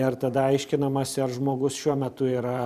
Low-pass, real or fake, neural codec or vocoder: 14.4 kHz; fake; vocoder, 44.1 kHz, 128 mel bands every 512 samples, BigVGAN v2